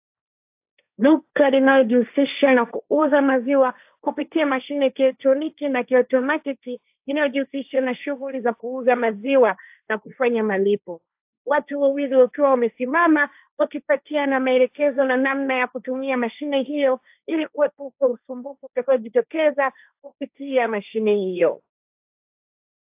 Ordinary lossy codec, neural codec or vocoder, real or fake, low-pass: AAC, 32 kbps; codec, 16 kHz, 1.1 kbps, Voila-Tokenizer; fake; 3.6 kHz